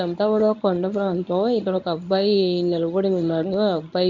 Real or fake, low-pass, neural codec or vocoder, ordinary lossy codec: fake; 7.2 kHz; codec, 24 kHz, 0.9 kbps, WavTokenizer, medium speech release version 1; none